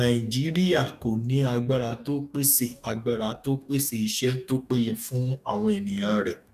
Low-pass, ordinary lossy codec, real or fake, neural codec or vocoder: 14.4 kHz; none; fake; codec, 44.1 kHz, 2.6 kbps, DAC